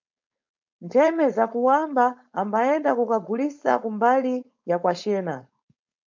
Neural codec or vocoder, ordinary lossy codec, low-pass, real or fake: codec, 16 kHz, 4.8 kbps, FACodec; MP3, 64 kbps; 7.2 kHz; fake